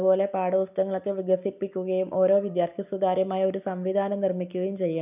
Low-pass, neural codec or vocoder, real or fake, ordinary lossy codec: 3.6 kHz; vocoder, 44.1 kHz, 128 mel bands every 256 samples, BigVGAN v2; fake; none